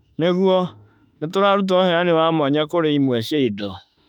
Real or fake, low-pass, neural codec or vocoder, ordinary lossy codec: fake; 19.8 kHz; autoencoder, 48 kHz, 32 numbers a frame, DAC-VAE, trained on Japanese speech; none